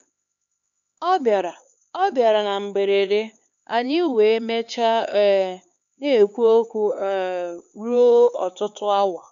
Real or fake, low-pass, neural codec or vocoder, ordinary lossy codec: fake; 7.2 kHz; codec, 16 kHz, 4 kbps, X-Codec, HuBERT features, trained on LibriSpeech; none